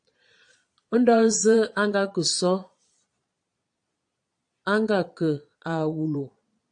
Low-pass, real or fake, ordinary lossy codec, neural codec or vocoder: 9.9 kHz; fake; AAC, 64 kbps; vocoder, 22.05 kHz, 80 mel bands, Vocos